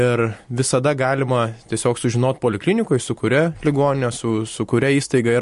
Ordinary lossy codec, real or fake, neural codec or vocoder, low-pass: MP3, 48 kbps; real; none; 14.4 kHz